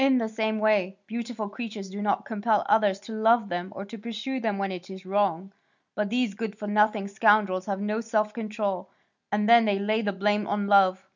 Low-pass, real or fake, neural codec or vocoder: 7.2 kHz; real; none